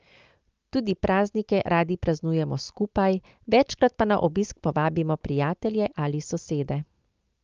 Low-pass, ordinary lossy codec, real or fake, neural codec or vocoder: 7.2 kHz; Opus, 24 kbps; real; none